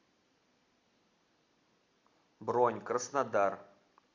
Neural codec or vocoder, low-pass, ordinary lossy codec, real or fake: none; 7.2 kHz; MP3, 64 kbps; real